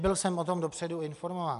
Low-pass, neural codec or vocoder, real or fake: 10.8 kHz; none; real